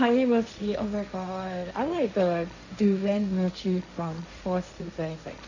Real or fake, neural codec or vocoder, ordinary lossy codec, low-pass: fake; codec, 16 kHz, 1.1 kbps, Voila-Tokenizer; none; 7.2 kHz